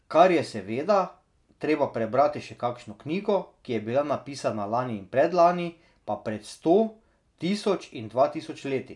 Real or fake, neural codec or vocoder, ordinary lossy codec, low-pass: real; none; none; 10.8 kHz